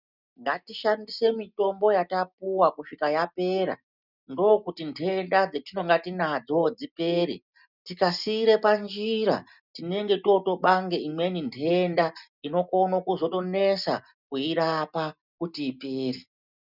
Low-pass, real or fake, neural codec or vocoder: 5.4 kHz; real; none